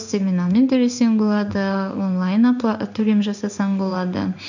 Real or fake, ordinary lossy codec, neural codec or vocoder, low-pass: fake; none; codec, 16 kHz in and 24 kHz out, 1 kbps, XY-Tokenizer; 7.2 kHz